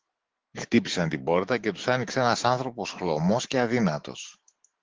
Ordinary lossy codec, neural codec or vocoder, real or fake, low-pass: Opus, 16 kbps; autoencoder, 48 kHz, 128 numbers a frame, DAC-VAE, trained on Japanese speech; fake; 7.2 kHz